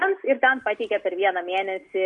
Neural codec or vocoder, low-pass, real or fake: none; 10.8 kHz; real